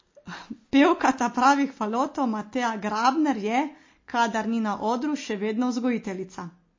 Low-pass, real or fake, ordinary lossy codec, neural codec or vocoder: 7.2 kHz; real; MP3, 32 kbps; none